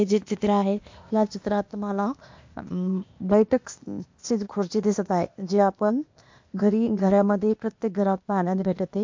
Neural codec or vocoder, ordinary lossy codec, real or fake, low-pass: codec, 16 kHz, 0.8 kbps, ZipCodec; MP3, 48 kbps; fake; 7.2 kHz